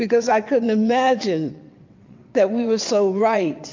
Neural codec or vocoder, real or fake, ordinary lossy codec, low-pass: vocoder, 22.05 kHz, 80 mel bands, WaveNeXt; fake; MP3, 48 kbps; 7.2 kHz